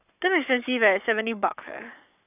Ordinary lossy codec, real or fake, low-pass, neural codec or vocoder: none; fake; 3.6 kHz; codec, 44.1 kHz, 7.8 kbps, Pupu-Codec